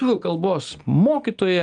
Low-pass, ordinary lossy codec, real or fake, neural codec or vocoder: 9.9 kHz; Opus, 32 kbps; real; none